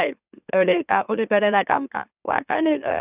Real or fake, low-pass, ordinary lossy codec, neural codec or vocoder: fake; 3.6 kHz; none; autoencoder, 44.1 kHz, a latent of 192 numbers a frame, MeloTTS